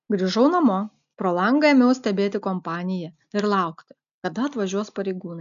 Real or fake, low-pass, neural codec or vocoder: real; 7.2 kHz; none